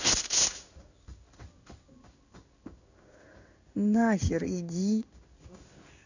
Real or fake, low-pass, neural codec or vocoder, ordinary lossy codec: fake; 7.2 kHz; codec, 16 kHz in and 24 kHz out, 1 kbps, XY-Tokenizer; none